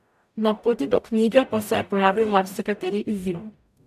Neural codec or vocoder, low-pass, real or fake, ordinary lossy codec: codec, 44.1 kHz, 0.9 kbps, DAC; 14.4 kHz; fake; none